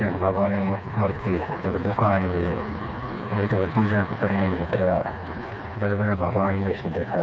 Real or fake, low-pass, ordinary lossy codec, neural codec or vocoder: fake; none; none; codec, 16 kHz, 2 kbps, FreqCodec, smaller model